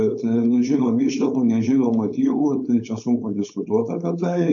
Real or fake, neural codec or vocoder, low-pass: fake; codec, 16 kHz, 4.8 kbps, FACodec; 7.2 kHz